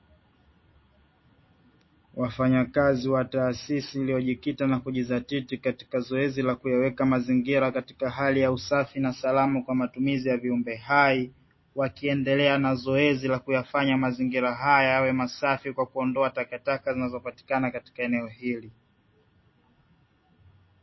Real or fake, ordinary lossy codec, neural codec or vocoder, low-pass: real; MP3, 24 kbps; none; 7.2 kHz